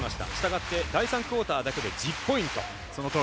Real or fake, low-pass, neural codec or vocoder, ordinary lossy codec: real; none; none; none